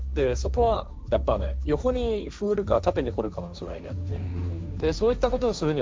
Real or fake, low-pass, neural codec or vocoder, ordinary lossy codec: fake; none; codec, 16 kHz, 1.1 kbps, Voila-Tokenizer; none